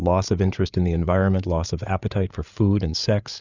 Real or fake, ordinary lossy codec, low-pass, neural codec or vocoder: fake; Opus, 64 kbps; 7.2 kHz; codec, 16 kHz, 8 kbps, FreqCodec, larger model